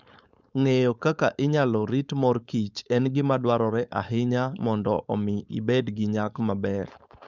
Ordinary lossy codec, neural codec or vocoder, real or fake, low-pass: none; codec, 16 kHz, 4.8 kbps, FACodec; fake; 7.2 kHz